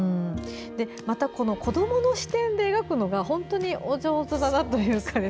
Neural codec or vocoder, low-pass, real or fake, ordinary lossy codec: none; none; real; none